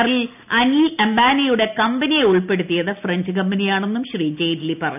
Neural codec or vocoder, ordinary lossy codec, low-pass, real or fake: none; none; 3.6 kHz; real